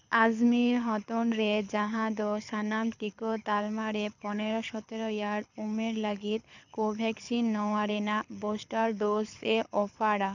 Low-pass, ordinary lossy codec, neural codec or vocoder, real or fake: 7.2 kHz; none; codec, 24 kHz, 6 kbps, HILCodec; fake